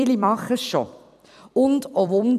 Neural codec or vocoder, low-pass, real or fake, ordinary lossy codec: vocoder, 44.1 kHz, 128 mel bands every 256 samples, BigVGAN v2; 14.4 kHz; fake; none